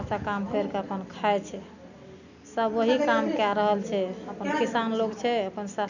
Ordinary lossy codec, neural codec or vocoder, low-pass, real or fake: none; none; 7.2 kHz; real